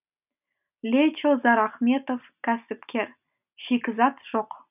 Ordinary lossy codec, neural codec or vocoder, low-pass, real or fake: none; none; 3.6 kHz; real